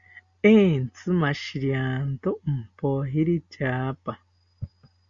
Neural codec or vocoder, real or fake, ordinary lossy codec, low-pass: none; real; Opus, 64 kbps; 7.2 kHz